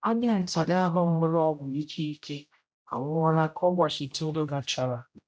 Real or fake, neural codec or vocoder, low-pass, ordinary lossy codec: fake; codec, 16 kHz, 0.5 kbps, X-Codec, HuBERT features, trained on general audio; none; none